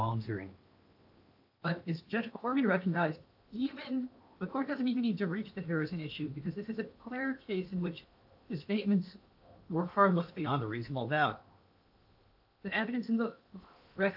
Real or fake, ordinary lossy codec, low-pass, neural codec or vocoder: fake; AAC, 48 kbps; 5.4 kHz; codec, 16 kHz in and 24 kHz out, 0.8 kbps, FocalCodec, streaming, 65536 codes